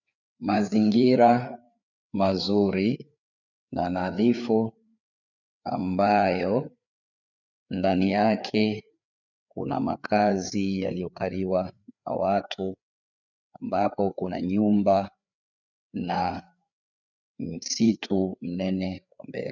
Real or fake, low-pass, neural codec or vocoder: fake; 7.2 kHz; codec, 16 kHz, 4 kbps, FreqCodec, larger model